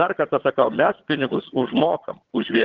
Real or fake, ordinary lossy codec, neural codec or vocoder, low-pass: fake; Opus, 16 kbps; vocoder, 22.05 kHz, 80 mel bands, HiFi-GAN; 7.2 kHz